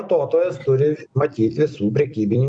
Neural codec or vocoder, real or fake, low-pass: vocoder, 44.1 kHz, 128 mel bands every 256 samples, BigVGAN v2; fake; 9.9 kHz